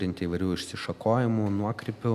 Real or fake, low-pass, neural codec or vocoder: real; 14.4 kHz; none